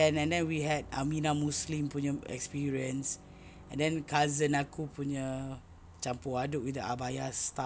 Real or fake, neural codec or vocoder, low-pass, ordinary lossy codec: real; none; none; none